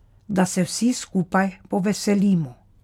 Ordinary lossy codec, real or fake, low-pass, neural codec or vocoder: none; real; 19.8 kHz; none